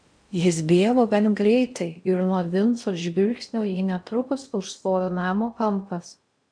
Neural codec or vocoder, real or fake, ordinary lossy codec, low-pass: codec, 16 kHz in and 24 kHz out, 0.6 kbps, FocalCodec, streaming, 4096 codes; fake; AAC, 64 kbps; 9.9 kHz